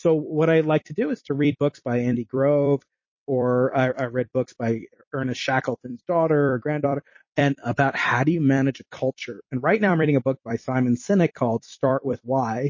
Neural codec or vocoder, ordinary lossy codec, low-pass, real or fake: vocoder, 44.1 kHz, 128 mel bands every 256 samples, BigVGAN v2; MP3, 32 kbps; 7.2 kHz; fake